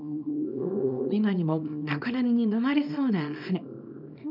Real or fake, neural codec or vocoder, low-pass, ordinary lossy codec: fake; codec, 24 kHz, 0.9 kbps, WavTokenizer, small release; 5.4 kHz; none